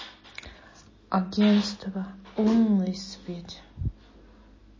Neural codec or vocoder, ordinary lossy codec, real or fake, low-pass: none; MP3, 32 kbps; real; 7.2 kHz